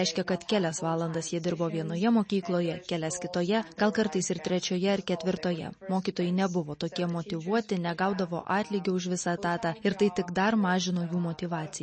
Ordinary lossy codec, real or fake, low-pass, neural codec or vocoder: MP3, 32 kbps; real; 10.8 kHz; none